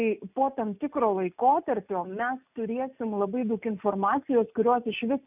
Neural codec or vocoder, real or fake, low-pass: none; real; 3.6 kHz